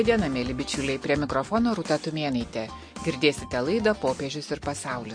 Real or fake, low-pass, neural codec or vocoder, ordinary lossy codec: real; 9.9 kHz; none; MP3, 48 kbps